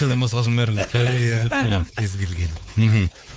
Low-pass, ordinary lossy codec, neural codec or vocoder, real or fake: none; none; codec, 16 kHz, 4 kbps, X-Codec, WavLM features, trained on Multilingual LibriSpeech; fake